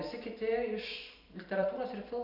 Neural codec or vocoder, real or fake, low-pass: vocoder, 44.1 kHz, 128 mel bands every 512 samples, BigVGAN v2; fake; 5.4 kHz